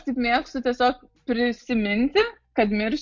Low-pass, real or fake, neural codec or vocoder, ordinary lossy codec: 7.2 kHz; real; none; MP3, 48 kbps